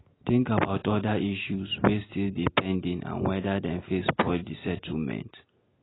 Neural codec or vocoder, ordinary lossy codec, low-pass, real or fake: none; AAC, 16 kbps; 7.2 kHz; real